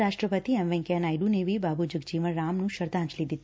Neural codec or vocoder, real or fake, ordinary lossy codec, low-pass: none; real; none; none